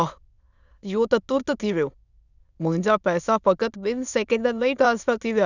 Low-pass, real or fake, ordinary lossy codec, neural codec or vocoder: 7.2 kHz; fake; none; autoencoder, 22.05 kHz, a latent of 192 numbers a frame, VITS, trained on many speakers